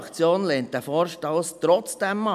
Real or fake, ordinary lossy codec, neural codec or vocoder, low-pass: real; none; none; 14.4 kHz